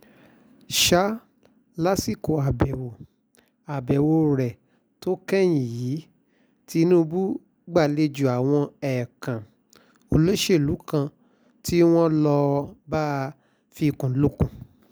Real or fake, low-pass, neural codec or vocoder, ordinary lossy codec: real; none; none; none